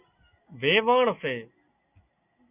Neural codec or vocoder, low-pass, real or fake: none; 3.6 kHz; real